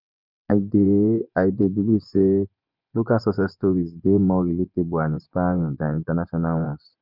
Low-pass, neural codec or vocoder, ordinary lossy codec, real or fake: 5.4 kHz; vocoder, 24 kHz, 100 mel bands, Vocos; none; fake